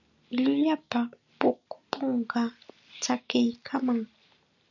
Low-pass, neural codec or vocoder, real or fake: 7.2 kHz; none; real